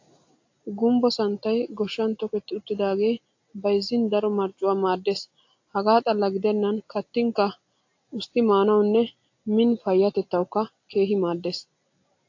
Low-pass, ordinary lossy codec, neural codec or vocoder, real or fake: 7.2 kHz; AAC, 48 kbps; none; real